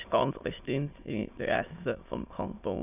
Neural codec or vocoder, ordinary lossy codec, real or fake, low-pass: autoencoder, 22.05 kHz, a latent of 192 numbers a frame, VITS, trained on many speakers; none; fake; 3.6 kHz